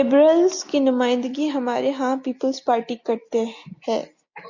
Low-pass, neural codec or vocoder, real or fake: 7.2 kHz; none; real